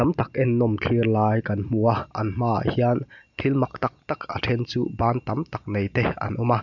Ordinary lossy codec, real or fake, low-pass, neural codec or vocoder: none; real; 7.2 kHz; none